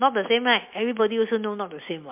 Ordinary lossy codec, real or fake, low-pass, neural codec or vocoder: MP3, 32 kbps; real; 3.6 kHz; none